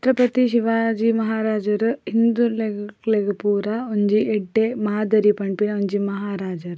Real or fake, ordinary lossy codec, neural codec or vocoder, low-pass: real; none; none; none